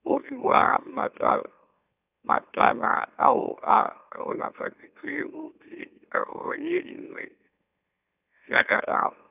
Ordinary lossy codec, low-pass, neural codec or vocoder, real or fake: none; 3.6 kHz; autoencoder, 44.1 kHz, a latent of 192 numbers a frame, MeloTTS; fake